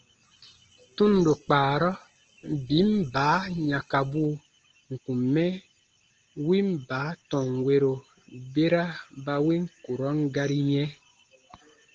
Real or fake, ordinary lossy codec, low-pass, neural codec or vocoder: real; Opus, 16 kbps; 7.2 kHz; none